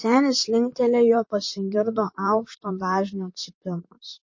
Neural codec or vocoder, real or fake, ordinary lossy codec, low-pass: vocoder, 44.1 kHz, 128 mel bands, Pupu-Vocoder; fake; MP3, 32 kbps; 7.2 kHz